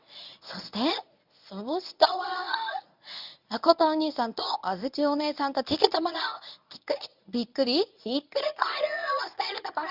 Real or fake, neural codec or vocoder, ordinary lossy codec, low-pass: fake; codec, 24 kHz, 0.9 kbps, WavTokenizer, medium speech release version 1; none; 5.4 kHz